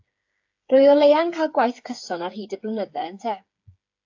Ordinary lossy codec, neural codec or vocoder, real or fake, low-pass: AAC, 48 kbps; codec, 16 kHz, 8 kbps, FreqCodec, smaller model; fake; 7.2 kHz